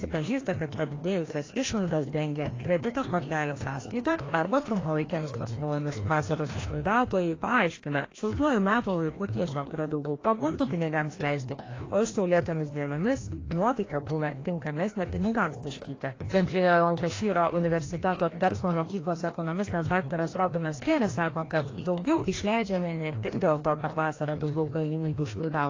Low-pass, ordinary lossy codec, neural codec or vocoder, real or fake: 7.2 kHz; AAC, 32 kbps; codec, 16 kHz, 1 kbps, FreqCodec, larger model; fake